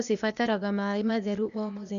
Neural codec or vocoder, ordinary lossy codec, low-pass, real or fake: codec, 16 kHz, 0.8 kbps, ZipCodec; none; 7.2 kHz; fake